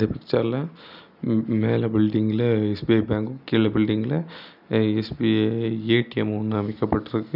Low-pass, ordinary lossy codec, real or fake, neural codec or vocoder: 5.4 kHz; none; real; none